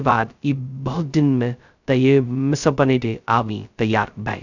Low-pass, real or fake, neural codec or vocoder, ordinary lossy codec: 7.2 kHz; fake; codec, 16 kHz, 0.2 kbps, FocalCodec; none